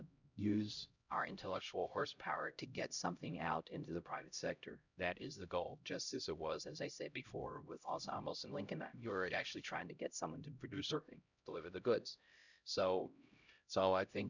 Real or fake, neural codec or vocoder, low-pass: fake; codec, 16 kHz, 0.5 kbps, X-Codec, HuBERT features, trained on LibriSpeech; 7.2 kHz